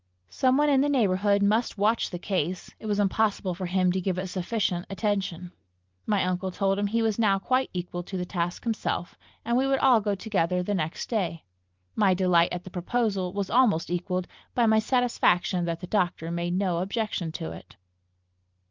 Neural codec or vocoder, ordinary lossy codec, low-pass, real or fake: none; Opus, 32 kbps; 7.2 kHz; real